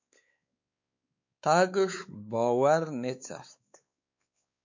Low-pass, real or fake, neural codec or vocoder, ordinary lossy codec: 7.2 kHz; fake; codec, 16 kHz, 4 kbps, X-Codec, WavLM features, trained on Multilingual LibriSpeech; MP3, 64 kbps